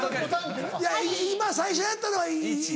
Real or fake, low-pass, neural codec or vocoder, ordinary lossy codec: real; none; none; none